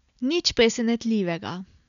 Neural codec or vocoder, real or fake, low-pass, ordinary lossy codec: none; real; 7.2 kHz; none